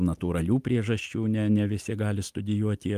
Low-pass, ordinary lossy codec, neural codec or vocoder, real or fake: 14.4 kHz; Opus, 32 kbps; none; real